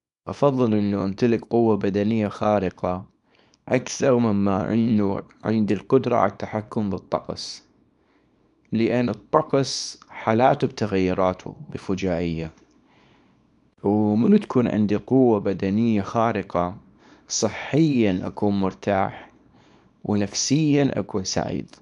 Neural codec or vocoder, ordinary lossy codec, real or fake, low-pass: codec, 24 kHz, 0.9 kbps, WavTokenizer, small release; none; fake; 10.8 kHz